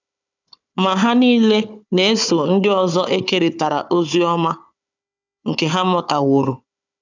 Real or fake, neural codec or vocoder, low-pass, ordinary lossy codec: fake; codec, 16 kHz, 4 kbps, FunCodec, trained on Chinese and English, 50 frames a second; 7.2 kHz; none